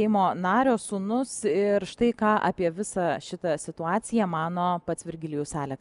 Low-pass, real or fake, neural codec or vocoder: 10.8 kHz; real; none